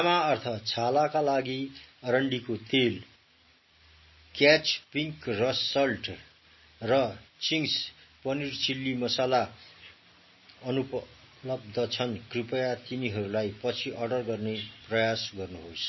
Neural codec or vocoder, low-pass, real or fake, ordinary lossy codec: none; 7.2 kHz; real; MP3, 24 kbps